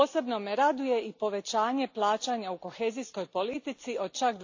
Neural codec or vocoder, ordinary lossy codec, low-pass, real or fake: none; AAC, 48 kbps; 7.2 kHz; real